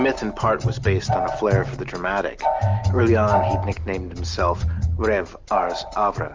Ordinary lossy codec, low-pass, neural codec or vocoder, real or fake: Opus, 32 kbps; 7.2 kHz; none; real